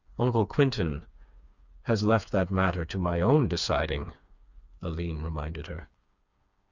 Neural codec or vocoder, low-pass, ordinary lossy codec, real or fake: codec, 16 kHz, 4 kbps, FreqCodec, smaller model; 7.2 kHz; Opus, 64 kbps; fake